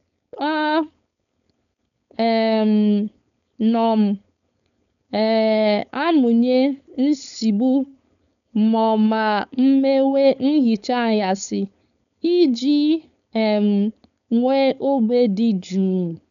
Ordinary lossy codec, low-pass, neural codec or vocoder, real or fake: none; 7.2 kHz; codec, 16 kHz, 4.8 kbps, FACodec; fake